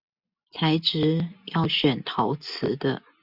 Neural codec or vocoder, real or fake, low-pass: none; real; 5.4 kHz